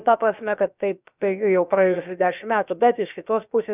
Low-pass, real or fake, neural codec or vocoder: 3.6 kHz; fake; codec, 16 kHz, about 1 kbps, DyCAST, with the encoder's durations